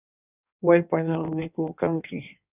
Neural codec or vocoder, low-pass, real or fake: codec, 16 kHz in and 24 kHz out, 1.1 kbps, FireRedTTS-2 codec; 3.6 kHz; fake